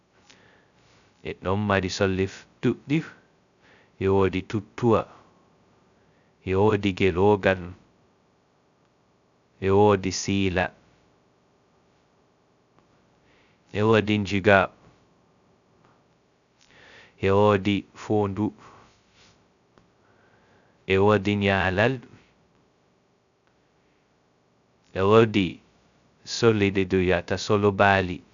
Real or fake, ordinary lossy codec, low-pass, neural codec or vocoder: fake; none; 7.2 kHz; codec, 16 kHz, 0.2 kbps, FocalCodec